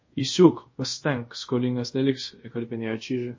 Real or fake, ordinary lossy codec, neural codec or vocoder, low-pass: fake; MP3, 32 kbps; codec, 24 kHz, 0.5 kbps, DualCodec; 7.2 kHz